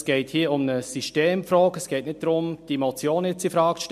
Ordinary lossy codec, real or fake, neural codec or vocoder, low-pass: MP3, 64 kbps; real; none; 14.4 kHz